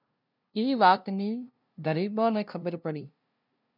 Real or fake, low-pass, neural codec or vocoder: fake; 5.4 kHz; codec, 16 kHz, 0.5 kbps, FunCodec, trained on LibriTTS, 25 frames a second